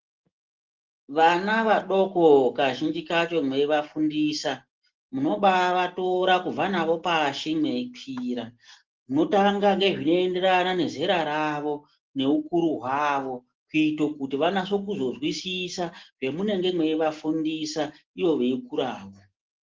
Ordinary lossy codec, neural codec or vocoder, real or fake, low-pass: Opus, 16 kbps; none; real; 7.2 kHz